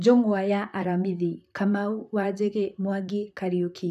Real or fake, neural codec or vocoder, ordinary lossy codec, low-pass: fake; vocoder, 44.1 kHz, 128 mel bands, Pupu-Vocoder; none; 14.4 kHz